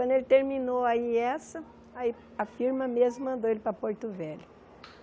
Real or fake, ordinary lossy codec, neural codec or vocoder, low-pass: real; none; none; none